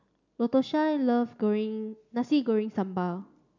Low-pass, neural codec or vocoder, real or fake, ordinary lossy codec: 7.2 kHz; none; real; none